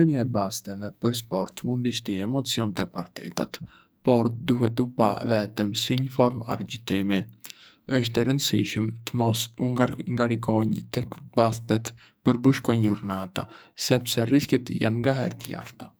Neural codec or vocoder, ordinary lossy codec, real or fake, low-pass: codec, 44.1 kHz, 2.6 kbps, SNAC; none; fake; none